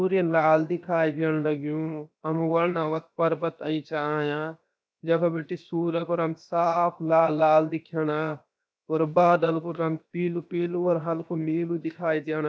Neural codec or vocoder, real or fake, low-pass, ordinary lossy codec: codec, 16 kHz, 0.7 kbps, FocalCodec; fake; none; none